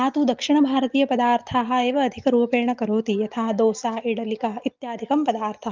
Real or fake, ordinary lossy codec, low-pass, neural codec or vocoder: real; Opus, 24 kbps; 7.2 kHz; none